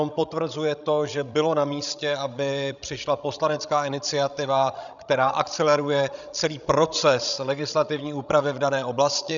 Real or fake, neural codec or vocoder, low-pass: fake; codec, 16 kHz, 16 kbps, FreqCodec, larger model; 7.2 kHz